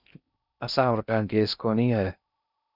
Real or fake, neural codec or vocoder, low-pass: fake; codec, 16 kHz in and 24 kHz out, 0.6 kbps, FocalCodec, streaming, 4096 codes; 5.4 kHz